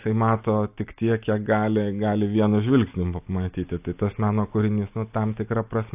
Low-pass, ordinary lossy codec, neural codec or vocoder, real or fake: 3.6 kHz; AAC, 32 kbps; none; real